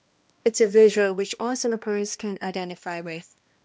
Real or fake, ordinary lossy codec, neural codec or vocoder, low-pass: fake; none; codec, 16 kHz, 1 kbps, X-Codec, HuBERT features, trained on balanced general audio; none